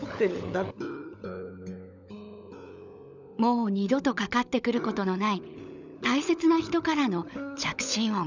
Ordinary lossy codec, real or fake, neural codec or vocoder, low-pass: none; fake; codec, 16 kHz, 16 kbps, FunCodec, trained on LibriTTS, 50 frames a second; 7.2 kHz